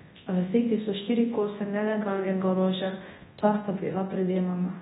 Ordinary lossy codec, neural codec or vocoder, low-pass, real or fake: AAC, 16 kbps; codec, 24 kHz, 0.9 kbps, WavTokenizer, large speech release; 10.8 kHz; fake